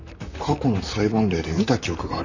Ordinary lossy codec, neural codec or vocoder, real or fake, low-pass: none; vocoder, 44.1 kHz, 128 mel bands, Pupu-Vocoder; fake; 7.2 kHz